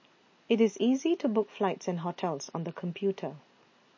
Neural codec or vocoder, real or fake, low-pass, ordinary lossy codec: none; real; 7.2 kHz; MP3, 32 kbps